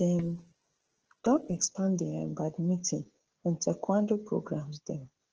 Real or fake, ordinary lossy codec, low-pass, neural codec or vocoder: fake; Opus, 16 kbps; 7.2 kHz; codec, 16 kHz, 16 kbps, FreqCodec, smaller model